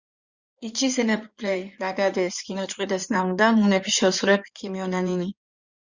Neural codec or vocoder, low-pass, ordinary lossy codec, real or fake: codec, 16 kHz in and 24 kHz out, 2.2 kbps, FireRedTTS-2 codec; 7.2 kHz; Opus, 64 kbps; fake